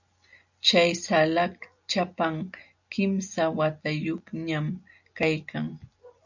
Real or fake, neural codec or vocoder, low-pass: real; none; 7.2 kHz